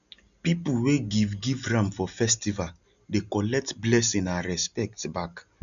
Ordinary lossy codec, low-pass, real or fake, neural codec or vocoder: none; 7.2 kHz; real; none